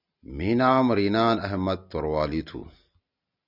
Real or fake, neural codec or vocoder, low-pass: real; none; 5.4 kHz